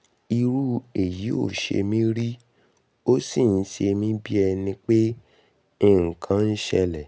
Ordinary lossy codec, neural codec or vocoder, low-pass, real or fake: none; none; none; real